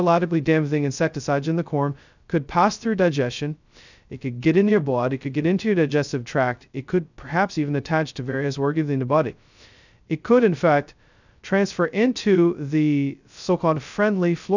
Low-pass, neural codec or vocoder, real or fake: 7.2 kHz; codec, 16 kHz, 0.2 kbps, FocalCodec; fake